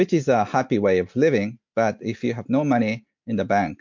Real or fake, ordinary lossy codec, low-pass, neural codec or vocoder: real; MP3, 48 kbps; 7.2 kHz; none